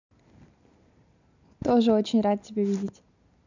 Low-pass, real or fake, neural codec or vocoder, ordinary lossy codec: 7.2 kHz; real; none; none